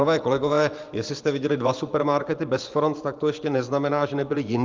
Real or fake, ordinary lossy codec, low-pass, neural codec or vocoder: fake; Opus, 24 kbps; 7.2 kHz; vocoder, 24 kHz, 100 mel bands, Vocos